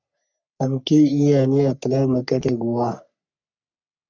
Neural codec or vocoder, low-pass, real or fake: codec, 44.1 kHz, 3.4 kbps, Pupu-Codec; 7.2 kHz; fake